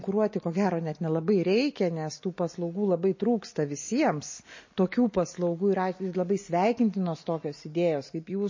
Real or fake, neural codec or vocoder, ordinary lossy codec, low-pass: real; none; MP3, 32 kbps; 7.2 kHz